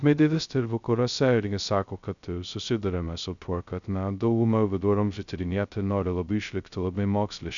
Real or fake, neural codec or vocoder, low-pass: fake; codec, 16 kHz, 0.2 kbps, FocalCodec; 7.2 kHz